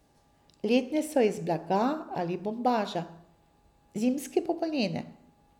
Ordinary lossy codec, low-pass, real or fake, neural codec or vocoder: none; 19.8 kHz; real; none